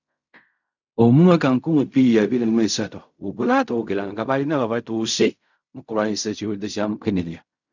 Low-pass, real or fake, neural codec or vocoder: 7.2 kHz; fake; codec, 16 kHz in and 24 kHz out, 0.4 kbps, LongCat-Audio-Codec, fine tuned four codebook decoder